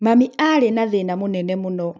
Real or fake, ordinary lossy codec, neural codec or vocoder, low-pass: real; none; none; none